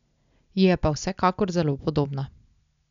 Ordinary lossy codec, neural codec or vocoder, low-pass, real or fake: none; none; 7.2 kHz; real